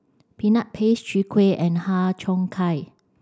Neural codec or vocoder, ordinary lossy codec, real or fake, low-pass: none; none; real; none